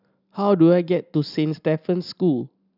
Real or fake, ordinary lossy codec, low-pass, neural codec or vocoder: real; none; 5.4 kHz; none